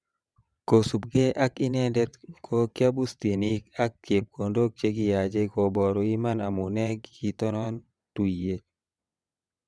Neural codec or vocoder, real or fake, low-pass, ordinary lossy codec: vocoder, 22.05 kHz, 80 mel bands, WaveNeXt; fake; none; none